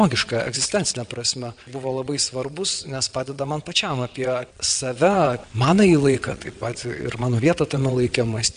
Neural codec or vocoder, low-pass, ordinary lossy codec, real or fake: vocoder, 22.05 kHz, 80 mel bands, WaveNeXt; 9.9 kHz; AAC, 96 kbps; fake